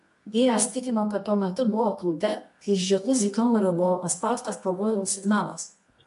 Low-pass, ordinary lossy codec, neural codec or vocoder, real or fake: 10.8 kHz; AAC, 64 kbps; codec, 24 kHz, 0.9 kbps, WavTokenizer, medium music audio release; fake